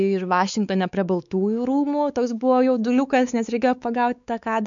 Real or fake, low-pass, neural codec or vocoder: fake; 7.2 kHz; codec, 16 kHz, 4 kbps, X-Codec, WavLM features, trained on Multilingual LibriSpeech